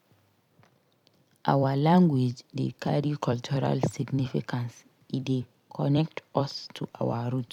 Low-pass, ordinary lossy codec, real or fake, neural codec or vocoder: 19.8 kHz; none; fake; vocoder, 44.1 kHz, 128 mel bands every 256 samples, BigVGAN v2